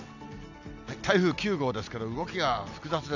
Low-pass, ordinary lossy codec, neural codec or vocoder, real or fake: 7.2 kHz; none; none; real